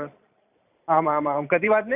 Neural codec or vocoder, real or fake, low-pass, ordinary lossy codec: vocoder, 44.1 kHz, 128 mel bands every 512 samples, BigVGAN v2; fake; 3.6 kHz; none